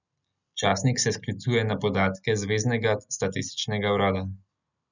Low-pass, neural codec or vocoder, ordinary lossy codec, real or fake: 7.2 kHz; none; none; real